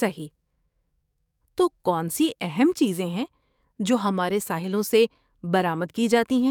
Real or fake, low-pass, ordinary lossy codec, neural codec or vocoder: fake; 19.8 kHz; none; codec, 44.1 kHz, 7.8 kbps, DAC